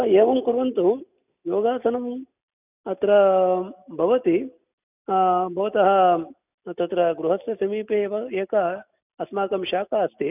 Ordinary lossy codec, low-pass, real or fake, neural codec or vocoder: none; 3.6 kHz; real; none